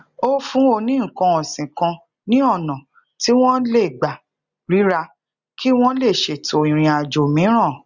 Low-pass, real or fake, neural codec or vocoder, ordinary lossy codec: 7.2 kHz; real; none; Opus, 64 kbps